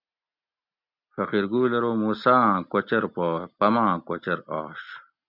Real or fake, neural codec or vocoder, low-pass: real; none; 5.4 kHz